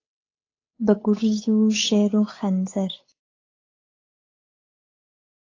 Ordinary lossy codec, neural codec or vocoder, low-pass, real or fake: AAC, 32 kbps; codec, 16 kHz, 8 kbps, FunCodec, trained on Chinese and English, 25 frames a second; 7.2 kHz; fake